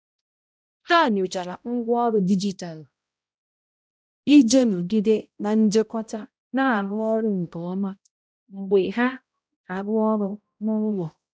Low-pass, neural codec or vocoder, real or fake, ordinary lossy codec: none; codec, 16 kHz, 0.5 kbps, X-Codec, HuBERT features, trained on balanced general audio; fake; none